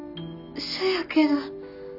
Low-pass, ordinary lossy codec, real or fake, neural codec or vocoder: 5.4 kHz; none; real; none